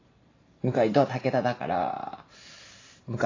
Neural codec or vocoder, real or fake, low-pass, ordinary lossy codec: none; real; 7.2 kHz; AAC, 32 kbps